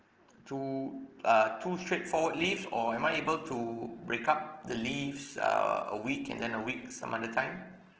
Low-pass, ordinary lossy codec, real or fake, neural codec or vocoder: 7.2 kHz; Opus, 16 kbps; real; none